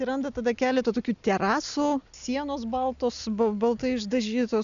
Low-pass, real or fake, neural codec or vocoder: 7.2 kHz; real; none